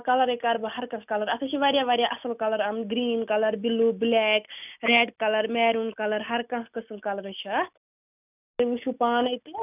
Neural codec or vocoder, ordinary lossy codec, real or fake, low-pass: none; none; real; 3.6 kHz